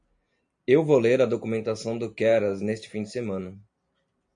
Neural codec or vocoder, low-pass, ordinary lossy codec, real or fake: none; 9.9 kHz; MP3, 64 kbps; real